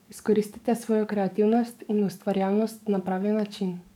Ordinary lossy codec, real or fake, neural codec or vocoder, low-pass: none; fake; codec, 44.1 kHz, 7.8 kbps, DAC; 19.8 kHz